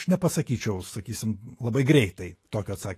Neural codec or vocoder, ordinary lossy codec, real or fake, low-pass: autoencoder, 48 kHz, 128 numbers a frame, DAC-VAE, trained on Japanese speech; AAC, 48 kbps; fake; 14.4 kHz